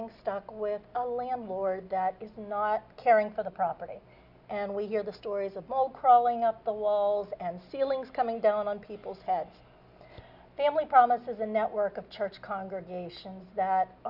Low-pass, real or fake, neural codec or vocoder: 5.4 kHz; real; none